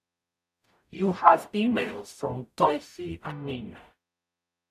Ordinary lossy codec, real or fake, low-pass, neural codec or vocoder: none; fake; 14.4 kHz; codec, 44.1 kHz, 0.9 kbps, DAC